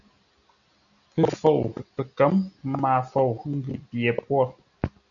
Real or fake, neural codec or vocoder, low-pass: real; none; 7.2 kHz